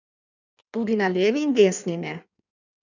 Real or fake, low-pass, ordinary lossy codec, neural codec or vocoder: fake; 7.2 kHz; none; codec, 16 kHz in and 24 kHz out, 1.1 kbps, FireRedTTS-2 codec